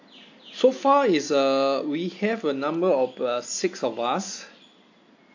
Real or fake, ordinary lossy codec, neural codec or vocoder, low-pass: real; AAC, 48 kbps; none; 7.2 kHz